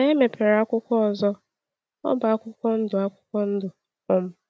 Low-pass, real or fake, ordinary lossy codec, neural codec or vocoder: none; real; none; none